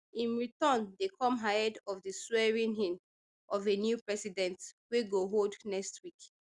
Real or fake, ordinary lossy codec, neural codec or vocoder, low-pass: real; Opus, 64 kbps; none; 10.8 kHz